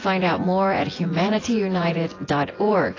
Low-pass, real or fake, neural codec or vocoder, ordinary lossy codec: 7.2 kHz; fake; vocoder, 24 kHz, 100 mel bands, Vocos; AAC, 32 kbps